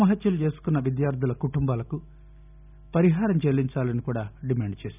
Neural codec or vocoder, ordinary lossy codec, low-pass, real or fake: none; none; 3.6 kHz; real